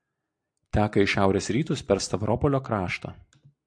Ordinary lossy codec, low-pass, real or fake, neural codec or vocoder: AAC, 64 kbps; 9.9 kHz; real; none